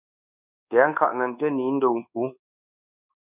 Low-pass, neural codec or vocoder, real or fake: 3.6 kHz; codec, 24 kHz, 1.2 kbps, DualCodec; fake